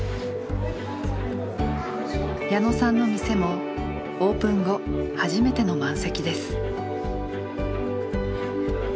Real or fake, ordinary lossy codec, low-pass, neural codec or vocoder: real; none; none; none